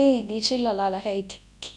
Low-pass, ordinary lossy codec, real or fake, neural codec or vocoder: none; none; fake; codec, 24 kHz, 0.9 kbps, WavTokenizer, large speech release